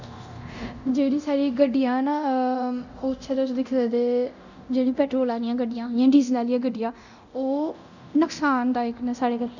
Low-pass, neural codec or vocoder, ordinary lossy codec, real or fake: 7.2 kHz; codec, 24 kHz, 0.9 kbps, DualCodec; none; fake